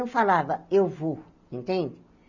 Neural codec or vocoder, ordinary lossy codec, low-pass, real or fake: none; none; 7.2 kHz; real